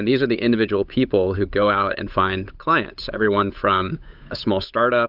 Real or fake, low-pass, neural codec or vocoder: fake; 5.4 kHz; codec, 16 kHz, 8 kbps, FunCodec, trained on Chinese and English, 25 frames a second